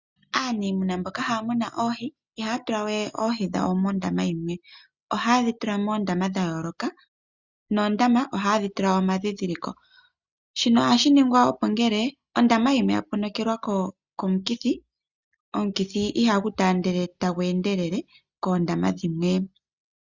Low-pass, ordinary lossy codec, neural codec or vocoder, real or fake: 7.2 kHz; Opus, 64 kbps; none; real